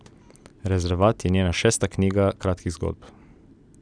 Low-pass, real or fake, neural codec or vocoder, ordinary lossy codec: 9.9 kHz; real; none; none